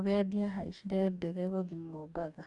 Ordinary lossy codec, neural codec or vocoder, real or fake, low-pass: none; codec, 44.1 kHz, 2.6 kbps, DAC; fake; 10.8 kHz